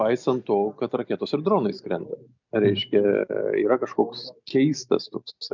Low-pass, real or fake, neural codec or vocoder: 7.2 kHz; real; none